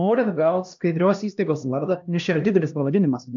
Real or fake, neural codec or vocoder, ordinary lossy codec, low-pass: fake; codec, 16 kHz, 1 kbps, X-Codec, HuBERT features, trained on LibriSpeech; MP3, 64 kbps; 7.2 kHz